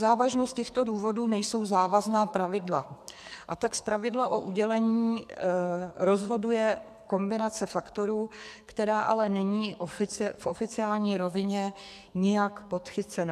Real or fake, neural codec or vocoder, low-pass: fake; codec, 44.1 kHz, 2.6 kbps, SNAC; 14.4 kHz